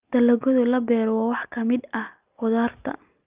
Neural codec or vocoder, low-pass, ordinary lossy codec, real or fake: none; 3.6 kHz; Opus, 24 kbps; real